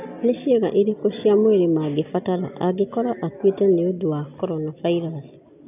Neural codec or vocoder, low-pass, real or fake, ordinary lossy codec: none; 3.6 kHz; real; none